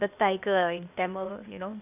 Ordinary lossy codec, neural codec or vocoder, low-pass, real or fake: none; codec, 16 kHz, 0.8 kbps, ZipCodec; 3.6 kHz; fake